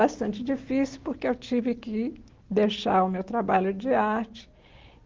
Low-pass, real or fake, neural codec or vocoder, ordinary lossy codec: 7.2 kHz; real; none; Opus, 16 kbps